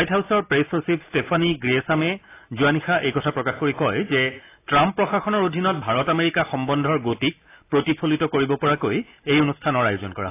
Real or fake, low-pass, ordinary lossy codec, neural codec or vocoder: real; 3.6 kHz; AAC, 24 kbps; none